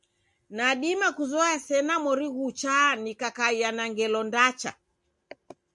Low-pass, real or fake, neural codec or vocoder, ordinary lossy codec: 10.8 kHz; real; none; MP3, 64 kbps